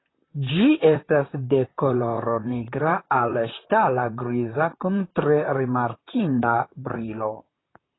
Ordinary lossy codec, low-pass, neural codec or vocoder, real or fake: AAC, 16 kbps; 7.2 kHz; vocoder, 44.1 kHz, 128 mel bands, Pupu-Vocoder; fake